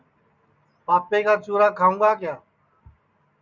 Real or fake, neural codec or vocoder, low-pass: real; none; 7.2 kHz